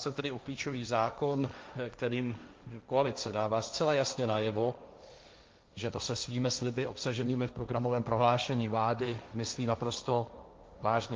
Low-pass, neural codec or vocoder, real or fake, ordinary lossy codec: 7.2 kHz; codec, 16 kHz, 1.1 kbps, Voila-Tokenizer; fake; Opus, 24 kbps